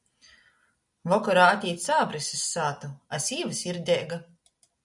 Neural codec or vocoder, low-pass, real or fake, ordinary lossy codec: none; 10.8 kHz; real; MP3, 96 kbps